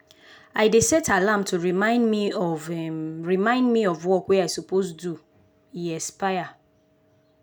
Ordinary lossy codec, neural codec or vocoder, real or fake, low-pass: none; none; real; none